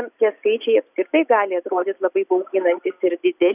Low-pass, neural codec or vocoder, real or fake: 3.6 kHz; none; real